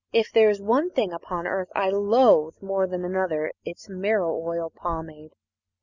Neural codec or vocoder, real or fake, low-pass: none; real; 7.2 kHz